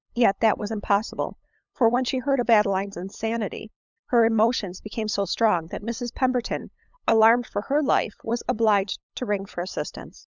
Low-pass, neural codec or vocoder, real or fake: 7.2 kHz; codec, 16 kHz, 8 kbps, FunCodec, trained on LibriTTS, 25 frames a second; fake